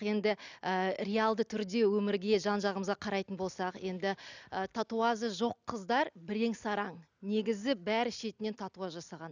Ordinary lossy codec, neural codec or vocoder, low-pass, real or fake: none; none; 7.2 kHz; real